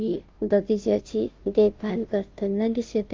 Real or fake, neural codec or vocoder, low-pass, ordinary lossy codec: fake; codec, 16 kHz, 0.5 kbps, FunCodec, trained on Chinese and English, 25 frames a second; 7.2 kHz; Opus, 24 kbps